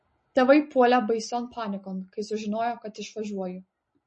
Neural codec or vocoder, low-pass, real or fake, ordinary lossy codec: none; 10.8 kHz; real; MP3, 32 kbps